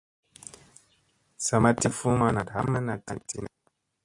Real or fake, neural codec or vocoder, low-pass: real; none; 10.8 kHz